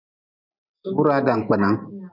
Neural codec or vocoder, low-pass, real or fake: none; 5.4 kHz; real